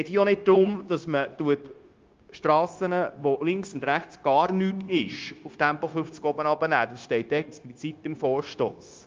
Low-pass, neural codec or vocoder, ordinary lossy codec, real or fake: 7.2 kHz; codec, 16 kHz, 0.9 kbps, LongCat-Audio-Codec; Opus, 24 kbps; fake